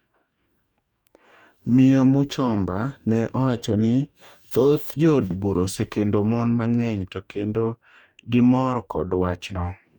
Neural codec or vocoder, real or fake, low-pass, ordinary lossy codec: codec, 44.1 kHz, 2.6 kbps, DAC; fake; 19.8 kHz; none